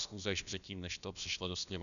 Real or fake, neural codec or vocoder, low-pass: fake; codec, 16 kHz, about 1 kbps, DyCAST, with the encoder's durations; 7.2 kHz